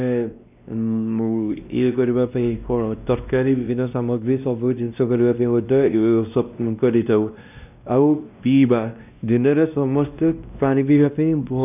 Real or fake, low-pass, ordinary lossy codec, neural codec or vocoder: fake; 3.6 kHz; none; codec, 16 kHz, 1 kbps, X-Codec, WavLM features, trained on Multilingual LibriSpeech